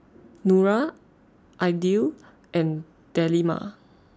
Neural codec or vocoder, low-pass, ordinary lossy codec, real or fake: none; none; none; real